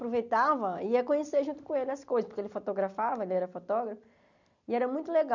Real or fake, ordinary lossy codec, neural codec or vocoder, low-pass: real; none; none; 7.2 kHz